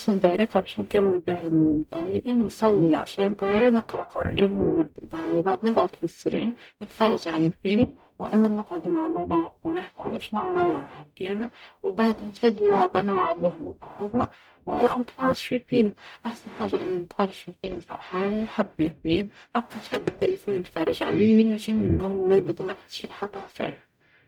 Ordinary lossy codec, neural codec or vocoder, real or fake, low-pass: none; codec, 44.1 kHz, 0.9 kbps, DAC; fake; 19.8 kHz